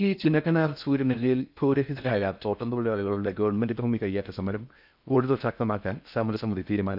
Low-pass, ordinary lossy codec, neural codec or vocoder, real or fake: 5.4 kHz; none; codec, 16 kHz in and 24 kHz out, 0.6 kbps, FocalCodec, streaming, 4096 codes; fake